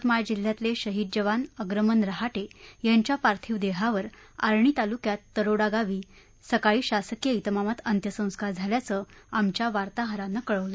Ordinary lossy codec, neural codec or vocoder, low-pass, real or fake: none; none; none; real